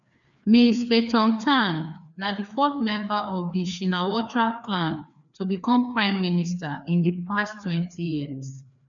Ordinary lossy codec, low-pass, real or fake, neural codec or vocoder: none; 7.2 kHz; fake; codec, 16 kHz, 2 kbps, FreqCodec, larger model